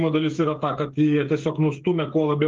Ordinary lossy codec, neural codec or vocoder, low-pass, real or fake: Opus, 24 kbps; codec, 16 kHz, 8 kbps, FreqCodec, smaller model; 7.2 kHz; fake